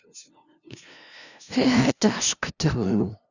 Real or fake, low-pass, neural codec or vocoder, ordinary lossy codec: fake; 7.2 kHz; codec, 16 kHz, 0.5 kbps, FunCodec, trained on LibriTTS, 25 frames a second; none